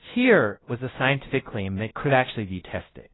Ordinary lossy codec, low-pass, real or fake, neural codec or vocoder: AAC, 16 kbps; 7.2 kHz; fake; codec, 16 kHz, 0.5 kbps, FunCodec, trained on LibriTTS, 25 frames a second